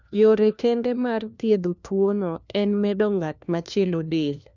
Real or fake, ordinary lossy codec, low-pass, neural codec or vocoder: fake; none; 7.2 kHz; codec, 16 kHz, 1 kbps, FunCodec, trained on LibriTTS, 50 frames a second